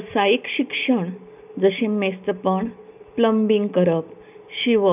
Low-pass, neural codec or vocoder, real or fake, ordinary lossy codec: 3.6 kHz; none; real; none